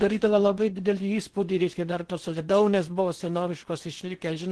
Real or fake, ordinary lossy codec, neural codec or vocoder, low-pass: fake; Opus, 16 kbps; codec, 16 kHz in and 24 kHz out, 0.8 kbps, FocalCodec, streaming, 65536 codes; 10.8 kHz